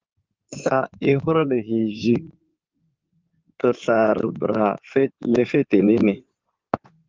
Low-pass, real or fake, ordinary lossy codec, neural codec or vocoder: 7.2 kHz; fake; Opus, 32 kbps; codec, 16 kHz in and 24 kHz out, 2.2 kbps, FireRedTTS-2 codec